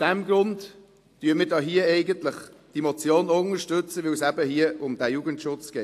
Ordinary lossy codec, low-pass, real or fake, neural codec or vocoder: AAC, 64 kbps; 14.4 kHz; real; none